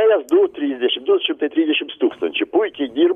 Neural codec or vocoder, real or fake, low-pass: none; real; 14.4 kHz